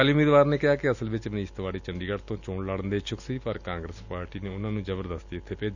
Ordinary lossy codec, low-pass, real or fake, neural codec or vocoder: none; 7.2 kHz; real; none